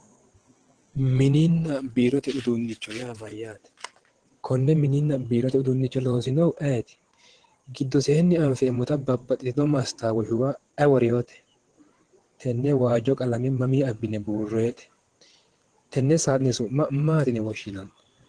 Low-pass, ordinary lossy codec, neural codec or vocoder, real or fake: 9.9 kHz; Opus, 16 kbps; vocoder, 22.05 kHz, 80 mel bands, WaveNeXt; fake